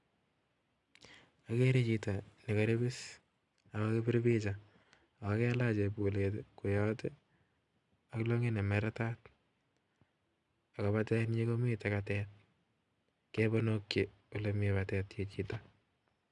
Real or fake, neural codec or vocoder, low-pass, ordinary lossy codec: real; none; 10.8 kHz; none